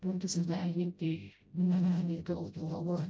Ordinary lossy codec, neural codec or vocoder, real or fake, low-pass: none; codec, 16 kHz, 0.5 kbps, FreqCodec, smaller model; fake; none